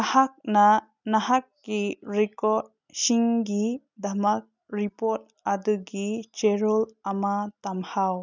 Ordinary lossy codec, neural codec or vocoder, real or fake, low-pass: none; none; real; 7.2 kHz